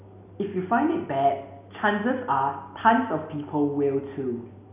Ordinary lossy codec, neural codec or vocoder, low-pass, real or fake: none; none; 3.6 kHz; real